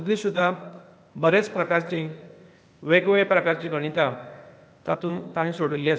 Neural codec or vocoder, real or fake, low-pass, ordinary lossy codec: codec, 16 kHz, 0.8 kbps, ZipCodec; fake; none; none